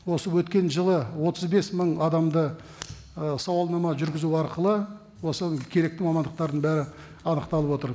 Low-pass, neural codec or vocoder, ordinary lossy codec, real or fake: none; none; none; real